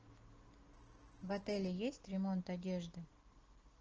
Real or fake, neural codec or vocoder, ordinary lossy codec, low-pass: real; none; Opus, 16 kbps; 7.2 kHz